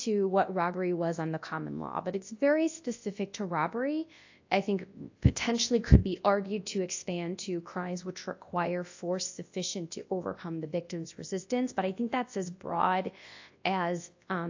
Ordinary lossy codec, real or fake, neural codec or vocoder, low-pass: AAC, 48 kbps; fake; codec, 24 kHz, 0.9 kbps, WavTokenizer, large speech release; 7.2 kHz